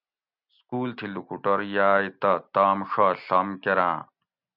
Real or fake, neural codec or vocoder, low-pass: real; none; 5.4 kHz